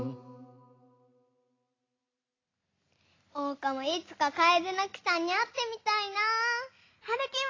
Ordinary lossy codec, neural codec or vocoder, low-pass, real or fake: AAC, 32 kbps; none; 7.2 kHz; real